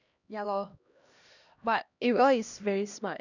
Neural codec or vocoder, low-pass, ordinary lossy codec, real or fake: codec, 16 kHz, 1 kbps, X-Codec, HuBERT features, trained on LibriSpeech; 7.2 kHz; none; fake